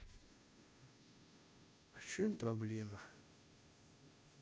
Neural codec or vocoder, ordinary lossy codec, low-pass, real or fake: codec, 16 kHz, 0.5 kbps, FunCodec, trained on Chinese and English, 25 frames a second; none; none; fake